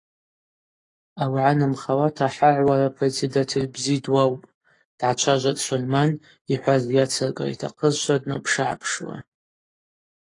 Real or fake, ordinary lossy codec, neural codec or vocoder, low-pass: fake; AAC, 48 kbps; codec, 44.1 kHz, 7.8 kbps, Pupu-Codec; 10.8 kHz